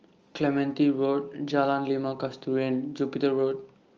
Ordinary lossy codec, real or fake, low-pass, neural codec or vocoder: Opus, 24 kbps; real; 7.2 kHz; none